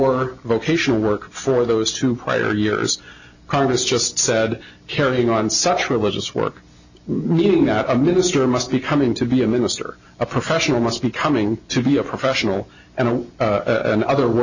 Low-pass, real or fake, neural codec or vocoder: 7.2 kHz; real; none